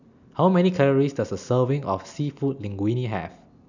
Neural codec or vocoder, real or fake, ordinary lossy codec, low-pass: none; real; none; 7.2 kHz